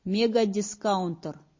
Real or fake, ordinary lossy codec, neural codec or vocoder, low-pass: real; MP3, 32 kbps; none; 7.2 kHz